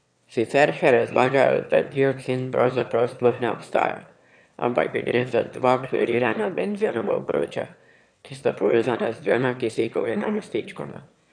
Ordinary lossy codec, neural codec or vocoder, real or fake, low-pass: none; autoencoder, 22.05 kHz, a latent of 192 numbers a frame, VITS, trained on one speaker; fake; 9.9 kHz